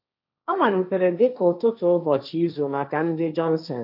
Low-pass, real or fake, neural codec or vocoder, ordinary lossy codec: 5.4 kHz; fake; codec, 16 kHz, 1.1 kbps, Voila-Tokenizer; none